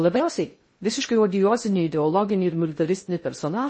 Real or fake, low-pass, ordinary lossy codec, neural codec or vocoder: fake; 9.9 kHz; MP3, 32 kbps; codec, 16 kHz in and 24 kHz out, 0.6 kbps, FocalCodec, streaming, 2048 codes